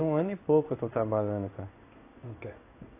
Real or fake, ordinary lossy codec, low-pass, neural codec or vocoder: fake; AAC, 24 kbps; 3.6 kHz; codec, 16 kHz in and 24 kHz out, 1 kbps, XY-Tokenizer